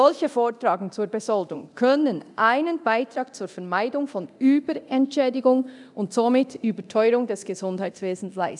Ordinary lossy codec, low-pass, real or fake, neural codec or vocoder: none; 10.8 kHz; fake; codec, 24 kHz, 0.9 kbps, DualCodec